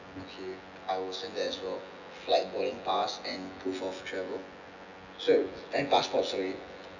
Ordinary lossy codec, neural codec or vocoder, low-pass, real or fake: none; vocoder, 24 kHz, 100 mel bands, Vocos; 7.2 kHz; fake